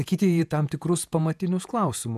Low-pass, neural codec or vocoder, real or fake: 14.4 kHz; vocoder, 48 kHz, 128 mel bands, Vocos; fake